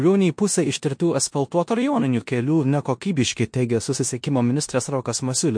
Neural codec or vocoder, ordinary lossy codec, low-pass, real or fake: codec, 16 kHz in and 24 kHz out, 0.9 kbps, LongCat-Audio-Codec, four codebook decoder; MP3, 48 kbps; 9.9 kHz; fake